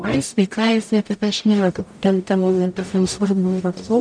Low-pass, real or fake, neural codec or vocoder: 9.9 kHz; fake; codec, 44.1 kHz, 0.9 kbps, DAC